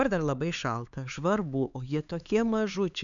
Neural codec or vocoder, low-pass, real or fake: codec, 16 kHz, 4 kbps, X-Codec, HuBERT features, trained on LibriSpeech; 7.2 kHz; fake